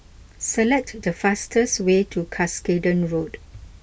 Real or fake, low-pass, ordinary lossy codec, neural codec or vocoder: real; none; none; none